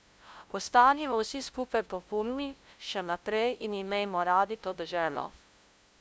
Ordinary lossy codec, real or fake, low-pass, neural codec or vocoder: none; fake; none; codec, 16 kHz, 0.5 kbps, FunCodec, trained on LibriTTS, 25 frames a second